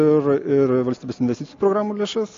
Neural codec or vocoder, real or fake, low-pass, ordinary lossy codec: none; real; 7.2 kHz; AAC, 48 kbps